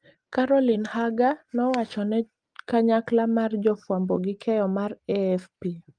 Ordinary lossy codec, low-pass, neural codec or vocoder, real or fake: Opus, 24 kbps; 9.9 kHz; none; real